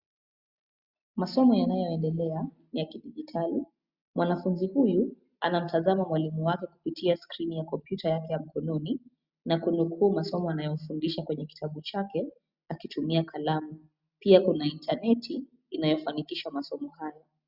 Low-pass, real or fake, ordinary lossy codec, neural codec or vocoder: 5.4 kHz; real; Opus, 64 kbps; none